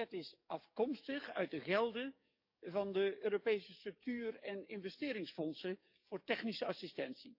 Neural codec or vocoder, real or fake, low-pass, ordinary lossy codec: codec, 44.1 kHz, 7.8 kbps, DAC; fake; 5.4 kHz; none